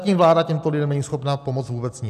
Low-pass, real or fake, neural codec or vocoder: 14.4 kHz; real; none